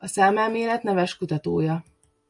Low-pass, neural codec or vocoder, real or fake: 10.8 kHz; none; real